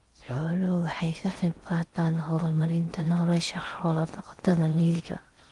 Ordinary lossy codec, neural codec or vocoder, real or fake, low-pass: Opus, 24 kbps; codec, 16 kHz in and 24 kHz out, 0.6 kbps, FocalCodec, streaming, 4096 codes; fake; 10.8 kHz